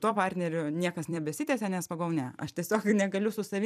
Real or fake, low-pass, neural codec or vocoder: fake; 14.4 kHz; vocoder, 44.1 kHz, 128 mel bands every 256 samples, BigVGAN v2